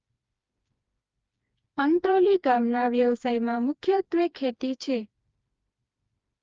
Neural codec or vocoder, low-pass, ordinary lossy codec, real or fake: codec, 16 kHz, 2 kbps, FreqCodec, smaller model; 7.2 kHz; Opus, 24 kbps; fake